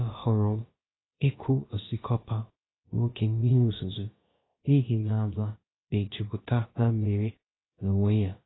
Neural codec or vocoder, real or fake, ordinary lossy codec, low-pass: codec, 16 kHz, about 1 kbps, DyCAST, with the encoder's durations; fake; AAC, 16 kbps; 7.2 kHz